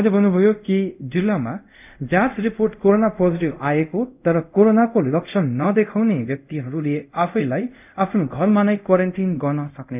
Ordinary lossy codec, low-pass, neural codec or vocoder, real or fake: none; 3.6 kHz; codec, 24 kHz, 0.5 kbps, DualCodec; fake